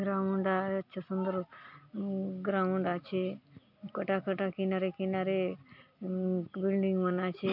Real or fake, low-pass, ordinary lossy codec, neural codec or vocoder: real; 5.4 kHz; none; none